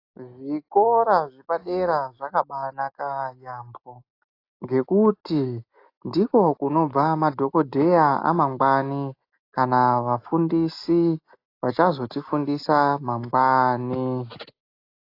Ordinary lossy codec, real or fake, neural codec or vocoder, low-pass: AAC, 32 kbps; real; none; 5.4 kHz